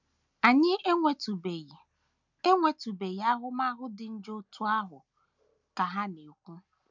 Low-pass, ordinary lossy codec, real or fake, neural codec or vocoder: 7.2 kHz; none; real; none